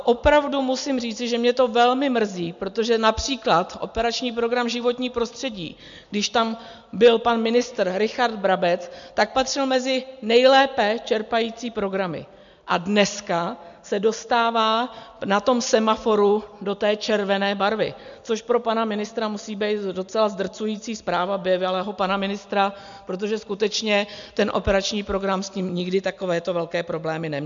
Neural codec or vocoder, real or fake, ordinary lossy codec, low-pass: none; real; MP3, 64 kbps; 7.2 kHz